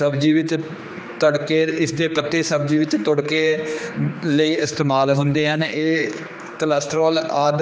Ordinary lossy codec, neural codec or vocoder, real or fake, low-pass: none; codec, 16 kHz, 2 kbps, X-Codec, HuBERT features, trained on general audio; fake; none